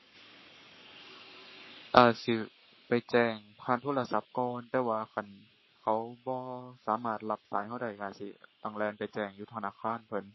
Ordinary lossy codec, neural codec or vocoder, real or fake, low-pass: MP3, 24 kbps; codec, 44.1 kHz, 7.8 kbps, Pupu-Codec; fake; 7.2 kHz